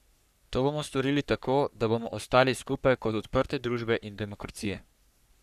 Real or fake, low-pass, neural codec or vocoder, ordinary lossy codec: fake; 14.4 kHz; codec, 44.1 kHz, 3.4 kbps, Pupu-Codec; none